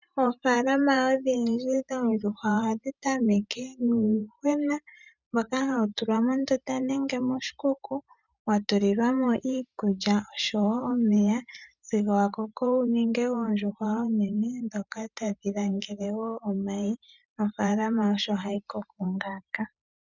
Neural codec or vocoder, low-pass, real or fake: vocoder, 44.1 kHz, 128 mel bands every 512 samples, BigVGAN v2; 7.2 kHz; fake